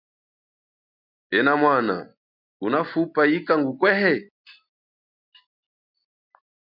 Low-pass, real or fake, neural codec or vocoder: 5.4 kHz; real; none